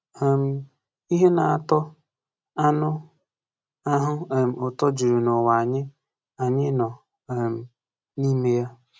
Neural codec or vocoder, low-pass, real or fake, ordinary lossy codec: none; none; real; none